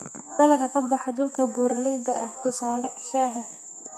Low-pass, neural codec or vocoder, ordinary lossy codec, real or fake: 14.4 kHz; codec, 32 kHz, 1.9 kbps, SNAC; none; fake